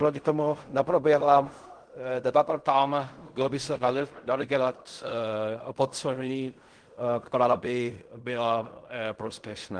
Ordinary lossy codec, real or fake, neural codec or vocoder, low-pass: Opus, 24 kbps; fake; codec, 16 kHz in and 24 kHz out, 0.4 kbps, LongCat-Audio-Codec, fine tuned four codebook decoder; 9.9 kHz